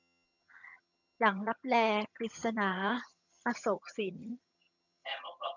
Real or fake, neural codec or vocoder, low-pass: fake; vocoder, 22.05 kHz, 80 mel bands, HiFi-GAN; 7.2 kHz